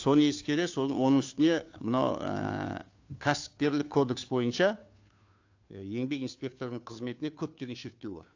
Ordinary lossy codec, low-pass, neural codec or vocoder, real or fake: AAC, 48 kbps; 7.2 kHz; codec, 16 kHz, 2 kbps, FunCodec, trained on Chinese and English, 25 frames a second; fake